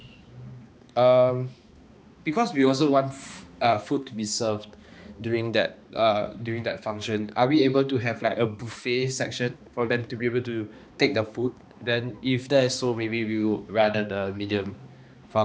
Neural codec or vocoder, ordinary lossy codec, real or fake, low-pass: codec, 16 kHz, 2 kbps, X-Codec, HuBERT features, trained on balanced general audio; none; fake; none